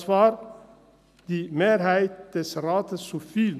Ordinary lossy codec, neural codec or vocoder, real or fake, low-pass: none; none; real; 14.4 kHz